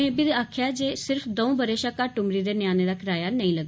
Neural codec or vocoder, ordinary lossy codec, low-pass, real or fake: none; none; none; real